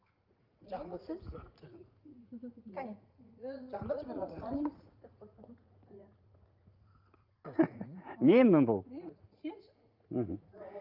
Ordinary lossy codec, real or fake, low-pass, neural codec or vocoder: Opus, 24 kbps; fake; 5.4 kHz; vocoder, 44.1 kHz, 80 mel bands, Vocos